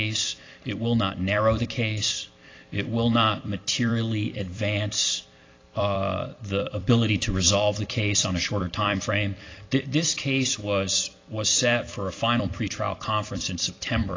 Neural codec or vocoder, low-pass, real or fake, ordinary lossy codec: vocoder, 44.1 kHz, 128 mel bands every 256 samples, BigVGAN v2; 7.2 kHz; fake; AAC, 32 kbps